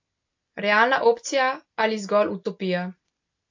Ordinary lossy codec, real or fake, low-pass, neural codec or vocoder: AAC, 48 kbps; real; 7.2 kHz; none